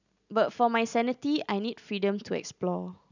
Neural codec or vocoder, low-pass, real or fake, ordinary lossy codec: none; 7.2 kHz; real; none